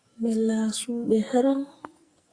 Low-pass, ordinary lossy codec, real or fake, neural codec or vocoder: 9.9 kHz; Opus, 64 kbps; fake; codec, 44.1 kHz, 2.6 kbps, SNAC